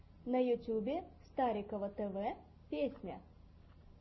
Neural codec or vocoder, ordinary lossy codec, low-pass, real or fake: none; MP3, 24 kbps; 7.2 kHz; real